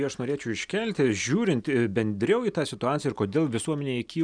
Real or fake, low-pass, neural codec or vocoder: real; 9.9 kHz; none